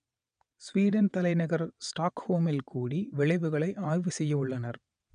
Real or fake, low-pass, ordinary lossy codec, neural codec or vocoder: fake; 9.9 kHz; none; vocoder, 22.05 kHz, 80 mel bands, WaveNeXt